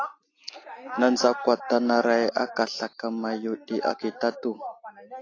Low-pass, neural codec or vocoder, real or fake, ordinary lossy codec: 7.2 kHz; none; real; AAC, 32 kbps